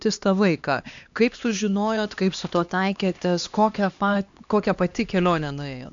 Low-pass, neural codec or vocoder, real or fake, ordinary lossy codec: 7.2 kHz; codec, 16 kHz, 2 kbps, X-Codec, HuBERT features, trained on LibriSpeech; fake; AAC, 64 kbps